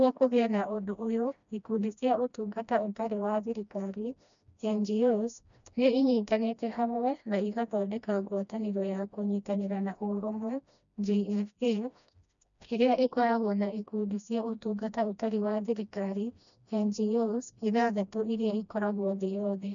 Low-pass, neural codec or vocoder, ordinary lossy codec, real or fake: 7.2 kHz; codec, 16 kHz, 1 kbps, FreqCodec, smaller model; none; fake